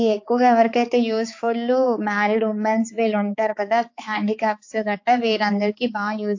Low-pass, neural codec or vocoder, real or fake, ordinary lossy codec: 7.2 kHz; codec, 16 kHz, 4 kbps, X-Codec, HuBERT features, trained on balanced general audio; fake; AAC, 48 kbps